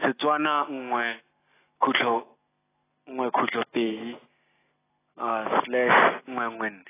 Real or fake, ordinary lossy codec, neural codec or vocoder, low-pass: real; AAC, 16 kbps; none; 3.6 kHz